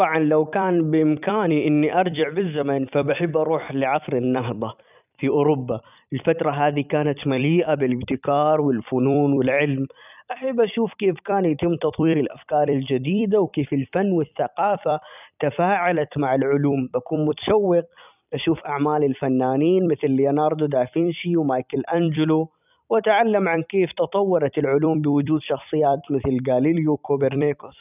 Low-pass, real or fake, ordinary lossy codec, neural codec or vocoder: 3.6 kHz; fake; none; vocoder, 44.1 kHz, 128 mel bands every 256 samples, BigVGAN v2